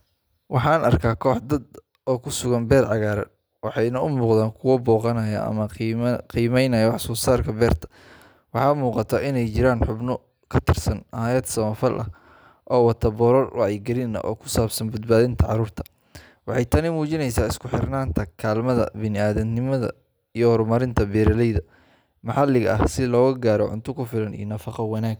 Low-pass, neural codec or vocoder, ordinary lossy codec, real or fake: none; none; none; real